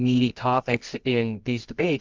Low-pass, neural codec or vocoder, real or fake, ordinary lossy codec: 7.2 kHz; codec, 24 kHz, 0.9 kbps, WavTokenizer, medium music audio release; fake; Opus, 32 kbps